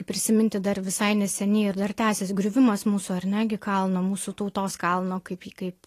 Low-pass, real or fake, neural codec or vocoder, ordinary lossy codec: 14.4 kHz; real; none; AAC, 48 kbps